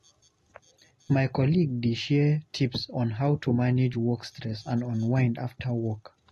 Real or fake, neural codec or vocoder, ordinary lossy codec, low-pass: real; none; AAC, 32 kbps; 19.8 kHz